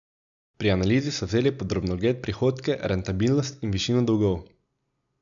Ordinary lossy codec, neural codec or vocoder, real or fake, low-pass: none; none; real; 7.2 kHz